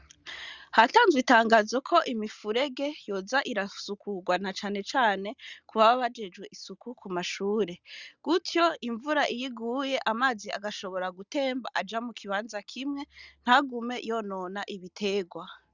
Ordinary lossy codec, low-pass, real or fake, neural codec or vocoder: Opus, 64 kbps; 7.2 kHz; real; none